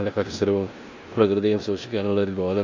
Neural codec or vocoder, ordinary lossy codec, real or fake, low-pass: codec, 16 kHz in and 24 kHz out, 0.9 kbps, LongCat-Audio-Codec, four codebook decoder; MP3, 48 kbps; fake; 7.2 kHz